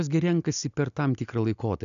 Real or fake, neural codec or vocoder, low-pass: real; none; 7.2 kHz